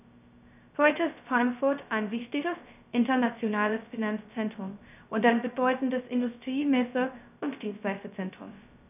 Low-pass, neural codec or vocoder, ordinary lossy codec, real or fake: 3.6 kHz; codec, 16 kHz, 0.2 kbps, FocalCodec; none; fake